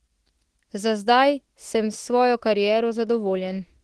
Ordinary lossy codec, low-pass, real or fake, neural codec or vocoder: Opus, 16 kbps; 10.8 kHz; fake; autoencoder, 48 kHz, 32 numbers a frame, DAC-VAE, trained on Japanese speech